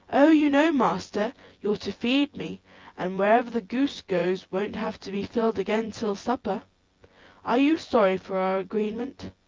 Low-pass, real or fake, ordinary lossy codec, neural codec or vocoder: 7.2 kHz; fake; Opus, 32 kbps; vocoder, 24 kHz, 100 mel bands, Vocos